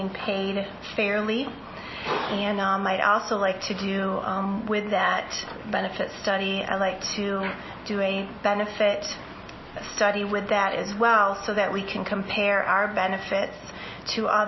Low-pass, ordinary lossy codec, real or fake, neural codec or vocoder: 7.2 kHz; MP3, 24 kbps; real; none